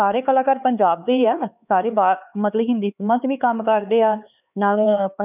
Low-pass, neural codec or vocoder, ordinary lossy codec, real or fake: 3.6 kHz; codec, 16 kHz, 4 kbps, X-Codec, HuBERT features, trained on LibriSpeech; none; fake